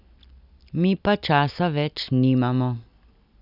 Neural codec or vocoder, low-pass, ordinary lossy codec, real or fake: none; 5.4 kHz; none; real